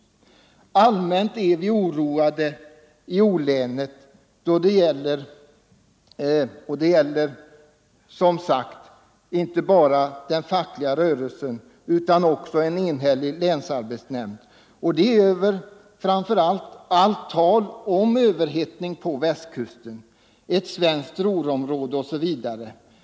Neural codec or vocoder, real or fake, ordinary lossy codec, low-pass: none; real; none; none